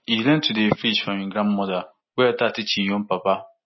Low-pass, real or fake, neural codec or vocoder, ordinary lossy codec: 7.2 kHz; real; none; MP3, 24 kbps